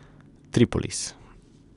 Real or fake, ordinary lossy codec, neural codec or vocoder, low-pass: real; none; none; 10.8 kHz